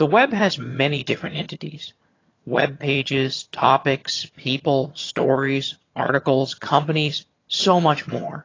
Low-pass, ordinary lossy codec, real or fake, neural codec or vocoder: 7.2 kHz; AAC, 32 kbps; fake; vocoder, 22.05 kHz, 80 mel bands, HiFi-GAN